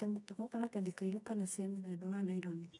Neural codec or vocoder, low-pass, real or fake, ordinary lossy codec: codec, 24 kHz, 0.9 kbps, WavTokenizer, medium music audio release; 10.8 kHz; fake; none